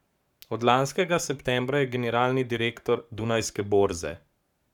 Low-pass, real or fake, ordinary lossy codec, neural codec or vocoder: 19.8 kHz; fake; none; codec, 44.1 kHz, 7.8 kbps, Pupu-Codec